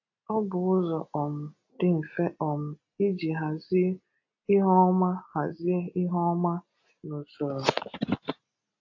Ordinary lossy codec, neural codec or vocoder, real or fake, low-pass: AAC, 48 kbps; none; real; 7.2 kHz